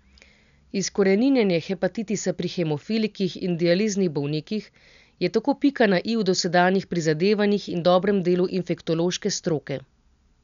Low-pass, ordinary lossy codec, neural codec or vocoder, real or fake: 7.2 kHz; none; none; real